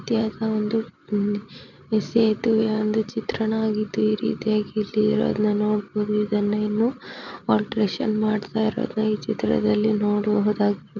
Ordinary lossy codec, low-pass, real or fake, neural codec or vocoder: none; 7.2 kHz; real; none